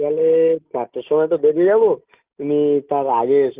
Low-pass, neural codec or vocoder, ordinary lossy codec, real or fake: 3.6 kHz; none; Opus, 32 kbps; real